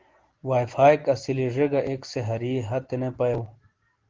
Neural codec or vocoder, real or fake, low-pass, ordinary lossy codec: none; real; 7.2 kHz; Opus, 16 kbps